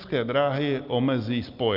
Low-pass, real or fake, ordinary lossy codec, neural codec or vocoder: 5.4 kHz; real; Opus, 24 kbps; none